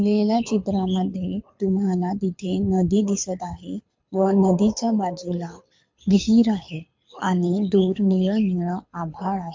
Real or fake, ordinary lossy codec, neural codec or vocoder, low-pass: fake; MP3, 48 kbps; codec, 24 kHz, 6 kbps, HILCodec; 7.2 kHz